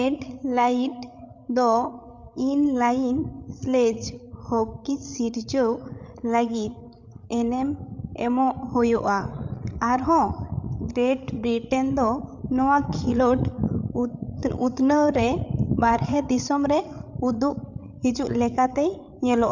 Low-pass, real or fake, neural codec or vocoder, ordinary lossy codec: 7.2 kHz; fake; codec, 16 kHz, 8 kbps, FreqCodec, larger model; none